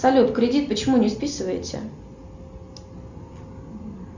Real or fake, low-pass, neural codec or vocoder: real; 7.2 kHz; none